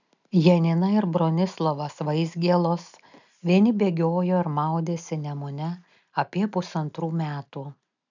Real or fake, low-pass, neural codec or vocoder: real; 7.2 kHz; none